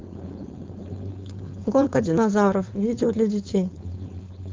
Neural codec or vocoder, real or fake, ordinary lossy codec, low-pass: codec, 16 kHz, 4.8 kbps, FACodec; fake; Opus, 24 kbps; 7.2 kHz